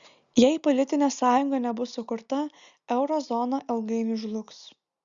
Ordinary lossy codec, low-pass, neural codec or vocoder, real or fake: Opus, 64 kbps; 7.2 kHz; none; real